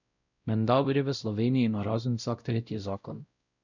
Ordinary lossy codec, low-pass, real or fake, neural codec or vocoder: none; 7.2 kHz; fake; codec, 16 kHz, 0.5 kbps, X-Codec, WavLM features, trained on Multilingual LibriSpeech